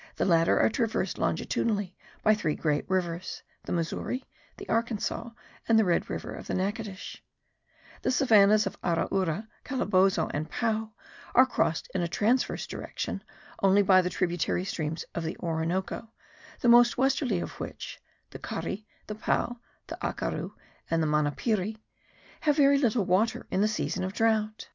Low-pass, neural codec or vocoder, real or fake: 7.2 kHz; none; real